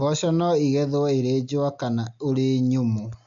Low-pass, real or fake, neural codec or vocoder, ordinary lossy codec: 7.2 kHz; real; none; AAC, 64 kbps